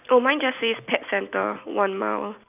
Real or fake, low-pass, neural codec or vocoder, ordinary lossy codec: real; 3.6 kHz; none; none